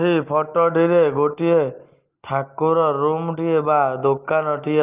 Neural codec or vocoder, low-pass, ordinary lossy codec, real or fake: none; 3.6 kHz; Opus, 32 kbps; real